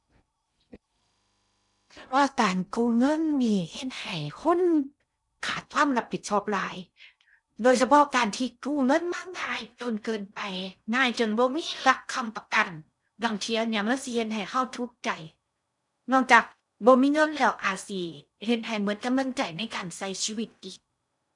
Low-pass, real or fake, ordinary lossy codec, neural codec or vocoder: 10.8 kHz; fake; none; codec, 16 kHz in and 24 kHz out, 0.6 kbps, FocalCodec, streaming, 2048 codes